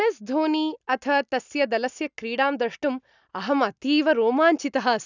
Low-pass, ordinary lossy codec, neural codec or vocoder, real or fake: 7.2 kHz; none; none; real